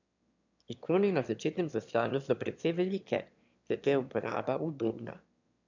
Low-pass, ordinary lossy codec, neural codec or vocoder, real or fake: 7.2 kHz; none; autoencoder, 22.05 kHz, a latent of 192 numbers a frame, VITS, trained on one speaker; fake